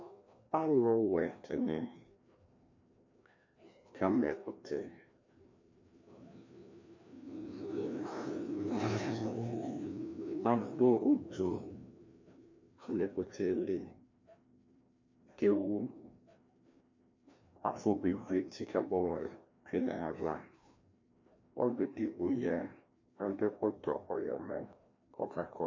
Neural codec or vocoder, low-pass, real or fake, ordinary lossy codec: codec, 16 kHz, 1 kbps, FreqCodec, larger model; 7.2 kHz; fake; MP3, 48 kbps